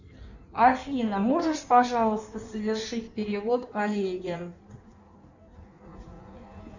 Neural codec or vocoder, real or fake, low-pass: codec, 16 kHz in and 24 kHz out, 1.1 kbps, FireRedTTS-2 codec; fake; 7.2 kHz